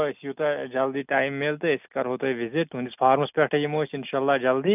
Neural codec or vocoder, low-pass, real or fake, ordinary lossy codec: none; 3.6 kHz; real; none